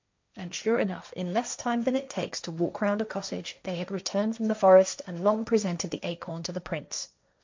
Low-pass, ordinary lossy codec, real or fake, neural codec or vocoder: 7.2 kHz; MP3, 64 kbps; fake; codec, 16 kHz, 1.1 kbps, Voila-Tokenizer